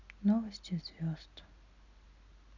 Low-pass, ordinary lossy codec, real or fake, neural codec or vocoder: 7.2 kHz; none; real; none